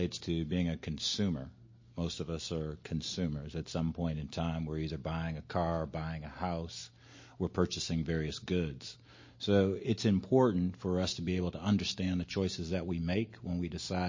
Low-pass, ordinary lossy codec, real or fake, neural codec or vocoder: 7.2 kHz; MP3, 32 kbps; real; none